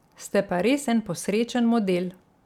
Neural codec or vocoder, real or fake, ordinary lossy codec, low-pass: none; real; none; 19.8 kHz